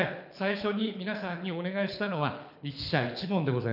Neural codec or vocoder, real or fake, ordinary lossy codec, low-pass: codec, 24 kHz, 6 kbps, HILCodec; fake; none; 5.4 kHz